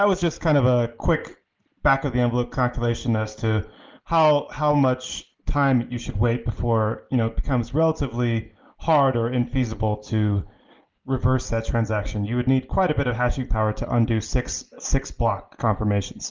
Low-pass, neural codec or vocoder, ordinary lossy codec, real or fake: 7.2 kHz; none; Opus, 32 kbps; real